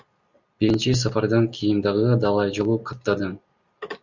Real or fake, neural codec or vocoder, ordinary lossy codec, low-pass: real; none; Opus, 64 kbps; 7.2 kHz